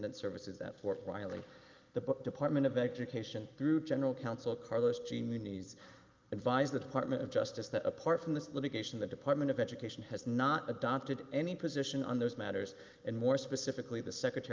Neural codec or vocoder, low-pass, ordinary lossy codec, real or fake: none; 7.2 kHz; Opus, 32 kbps; real